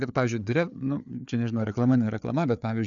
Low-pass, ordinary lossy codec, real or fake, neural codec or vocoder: 7.2 kHz; MP3, 96 kbps; fake; codec, 16 kHz, 4 kbps, FreqCodec, larger model